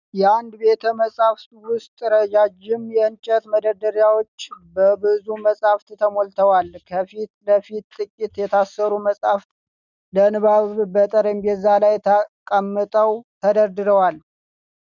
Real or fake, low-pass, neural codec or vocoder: real; 7.2 kHz; none